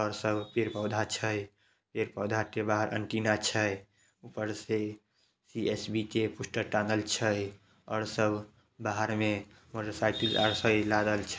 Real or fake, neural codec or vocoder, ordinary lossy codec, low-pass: real; none; none; none